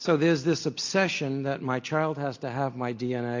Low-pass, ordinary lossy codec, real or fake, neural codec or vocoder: 7.2 kHz; AAC, 48 kbps; real; none